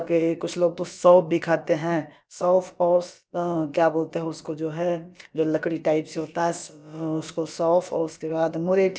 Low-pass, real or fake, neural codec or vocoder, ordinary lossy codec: none; fake; codec, 16 kHz, about 1 kbps, DyCAST, with the encoder's durations; none